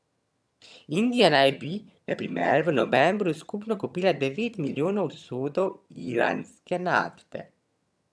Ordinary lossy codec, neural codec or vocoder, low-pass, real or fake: none; vocoder, 22.05 kHz, 80 mel bands, HiFi-GAN; none; fake